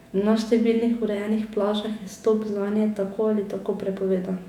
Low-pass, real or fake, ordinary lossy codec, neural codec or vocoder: 19.8 kHz; fake; none; autoencoder, 48 kHz, 128 numbers a frame, DAC-VAE, trained on Japanese speech